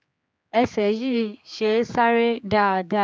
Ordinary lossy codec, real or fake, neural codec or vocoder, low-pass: none; fake; codec, 16 kHz, 4 kbps, X-Codec, HuBERT features, trained on general audio; none